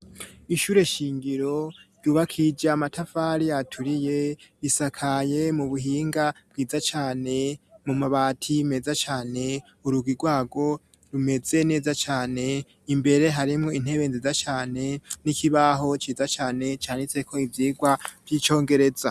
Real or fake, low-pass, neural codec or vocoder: real; 14.4 kHz; none